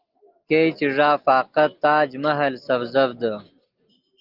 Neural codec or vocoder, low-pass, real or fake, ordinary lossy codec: none; 5.4 kHz; real; Opus, 32 kbps